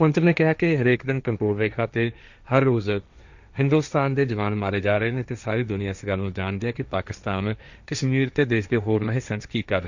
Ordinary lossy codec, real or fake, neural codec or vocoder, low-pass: none; fake; codec, 16 kHz, 1.1 kbps, Voila-Tokenizer; 7.2 kHz